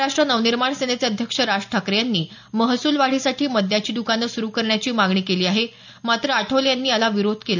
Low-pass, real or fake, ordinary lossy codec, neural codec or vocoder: 7.2 kHz; real; none; none